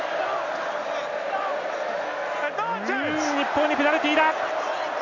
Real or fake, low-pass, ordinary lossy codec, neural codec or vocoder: real; 7.2 kHz; none; none